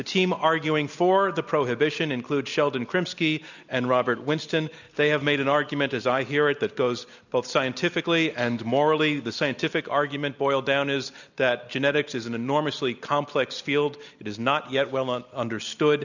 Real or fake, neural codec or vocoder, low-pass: real; none; 7.2 kHz